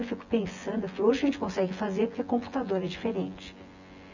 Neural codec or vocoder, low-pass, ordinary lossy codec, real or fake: vocoder, 24 kHz, 100 mel bands, Vocos; 7.2 kHz; none; fake